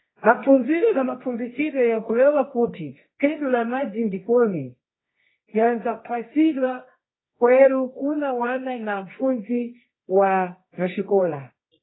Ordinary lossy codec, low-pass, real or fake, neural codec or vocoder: AAC, 16 kbps; 7.2 kHz; fake; codec, 24 kHz, 0.9 kbps, WavTokenizer, medium music audio release